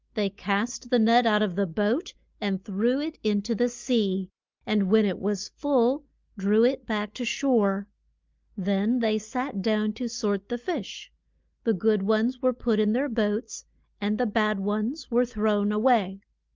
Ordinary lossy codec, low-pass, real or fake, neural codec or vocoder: Opus, 24 kbps; 7.2 kHz; real; none